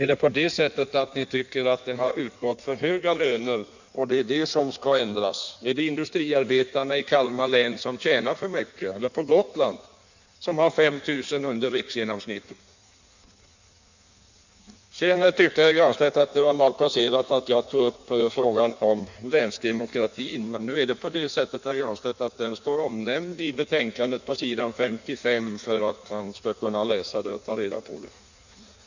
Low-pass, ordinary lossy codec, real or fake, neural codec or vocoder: 7.2 kHz; none; fake; codec, 16 kHz in and 24 kHz out, 1.1 kbps, FireRedTTS-2 codec